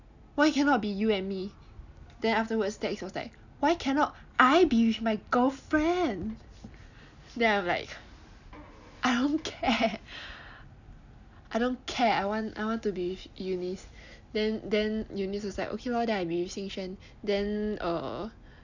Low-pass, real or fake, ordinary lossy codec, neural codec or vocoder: 7.2 kHz; real; none; none